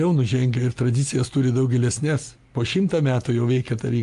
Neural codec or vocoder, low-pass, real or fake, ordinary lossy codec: none; 10.8 kHz; real; Opus, 32 kbps